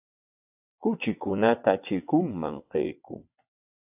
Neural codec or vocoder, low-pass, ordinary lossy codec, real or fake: vocoder, 22.05 kHz, 80 mel bands, WaveNeXt; 3.6 kHz; AAC, 32 kbps; fake